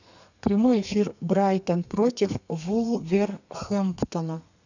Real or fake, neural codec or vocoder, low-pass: fake; codec, 32 kHz, 1.9 kbps, SNAC; 7.2 kHz